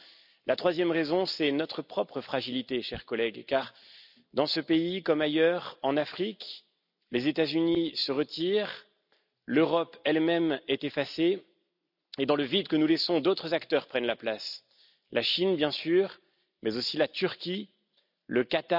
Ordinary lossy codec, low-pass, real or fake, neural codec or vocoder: none; 5.4 kHz; real; none